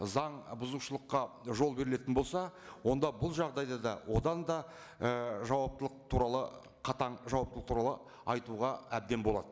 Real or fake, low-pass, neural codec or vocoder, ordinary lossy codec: real; none; none; none